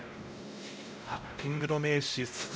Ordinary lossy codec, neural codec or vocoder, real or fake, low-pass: none; codec, 16 kHz, 0.5 kbps, X-Codec, WavLM features, trained on Multilingual LibriSpeech; fake; none